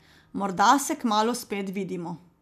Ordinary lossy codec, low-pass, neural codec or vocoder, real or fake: none; 14.4 kHz; none; real